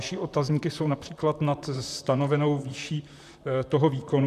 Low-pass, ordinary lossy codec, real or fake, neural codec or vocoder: 14.4 kHz; MP3, 96 kbps; fake; vocoder, 44.1 kHz, 128 mel bands, Pupu-Vocoder